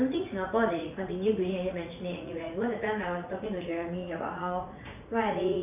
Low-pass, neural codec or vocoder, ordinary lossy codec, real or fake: 3.6 kHz; vocoder, 44.1 kHz, 80 mel bands, Vocos; none; fake